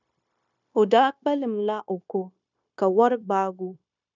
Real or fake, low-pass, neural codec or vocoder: fake; 7.2 kHz; codec, 16 kHz, 0.9 kbps, LongCat-Audio-Codec